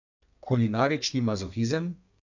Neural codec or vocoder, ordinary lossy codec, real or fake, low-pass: codec, 32 kHz, 1.9 kbps, SNAC; none; fake; 7.2 kHz